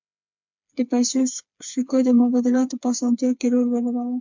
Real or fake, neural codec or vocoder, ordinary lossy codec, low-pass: fake; codec, 16 kHz, 4 kbps, FreqCodec, smaller model; MP3, 64 kbps; 7.2 kHz